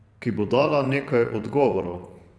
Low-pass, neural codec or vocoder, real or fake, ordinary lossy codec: none; vocoder, 22.05 kHz, 80 mel bands, WaveNeXt; fake; none